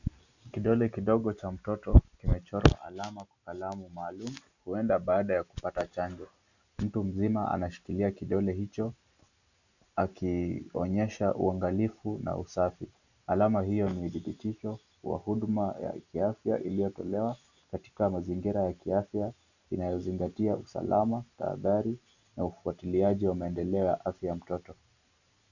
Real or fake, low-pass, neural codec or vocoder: real; 7.2 kHz; none